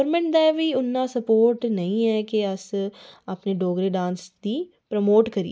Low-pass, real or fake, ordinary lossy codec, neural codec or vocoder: none; real; none; none